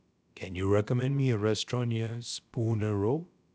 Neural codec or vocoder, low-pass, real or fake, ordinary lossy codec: codec, 16 kHz, about 1 kbps, DyCAST, with the encoder's durations; none; fake; none